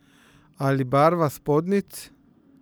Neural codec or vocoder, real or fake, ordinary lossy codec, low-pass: vocoder, 44.1 kHz, 128 mel bands every 512 samples, BigVGAN v2; fake; none; none